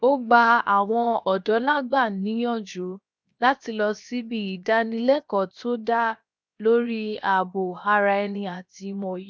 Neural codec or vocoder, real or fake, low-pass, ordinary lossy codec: codec, 16 kHz, 0.7 kbps, FocalCodec; fake; none; none